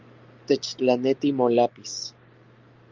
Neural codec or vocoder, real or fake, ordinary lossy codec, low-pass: none; real; Opus, 24 kbps; 7.2 kHz